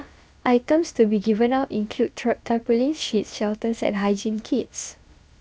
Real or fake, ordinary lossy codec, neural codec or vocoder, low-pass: fake; none; codec, 16 kHz, about 1 kbps, DyCAST, with the encoder's durations; none